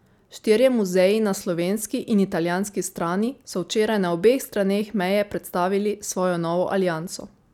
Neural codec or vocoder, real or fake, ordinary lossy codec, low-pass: none; real; none; 19.8 kHz